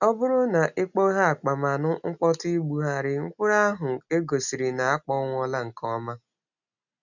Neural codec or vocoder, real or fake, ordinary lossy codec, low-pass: none; real; none; 7.2 kHz